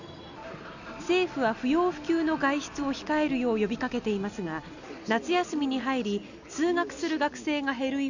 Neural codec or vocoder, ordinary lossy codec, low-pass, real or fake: none; none; 7.2 kHz; real